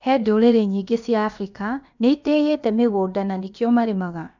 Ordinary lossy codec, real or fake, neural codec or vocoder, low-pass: none; fake; codec, 16 kHz, about 1 kbps, DyCAST, with the encoder's durations; 7.2 kHz